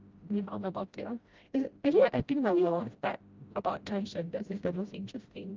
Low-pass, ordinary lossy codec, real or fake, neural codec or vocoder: 7.2 kHz; Opus, 24 kbps; fake; codec, 16 kHz, 0.5 kbps, FreqCodec, smaller model